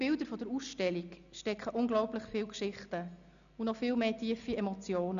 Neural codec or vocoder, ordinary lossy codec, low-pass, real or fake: none; none; 7.2 kHz; real